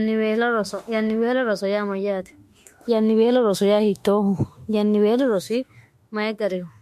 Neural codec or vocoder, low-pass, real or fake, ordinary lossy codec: autoencoder, 48 kHz, 32 numbers a frame, DAC-VAE, trained on Japanese speech; 14.4 kHz; fake; MP3, 64 kbps